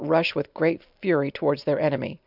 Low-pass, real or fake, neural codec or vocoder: 5.4 kHz; real; none